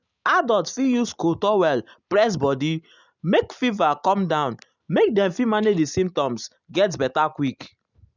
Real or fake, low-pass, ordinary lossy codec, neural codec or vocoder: real; 7.2 kHz; none; none